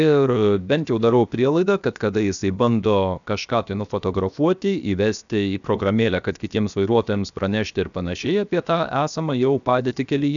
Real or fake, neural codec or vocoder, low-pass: fake; codec, 16 kHz, 0.7 kbps, FocalCodec; 7.2 kHz